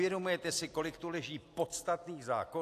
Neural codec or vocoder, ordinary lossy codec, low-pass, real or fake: none; AAC, 64 kbps; 14.4 kHz; real